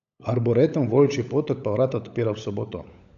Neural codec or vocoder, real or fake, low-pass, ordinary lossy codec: codec, 16 kHz, 16 kbps, FunCodec, trained on LibriTTS, 50 frames a second; fake; 7.2 kHz; none